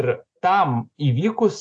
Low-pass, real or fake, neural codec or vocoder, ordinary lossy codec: 9.9 kHz; real; none; AAC, 48 kbps